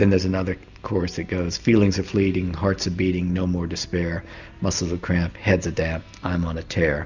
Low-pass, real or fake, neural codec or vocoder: 7.2 kHz; real; none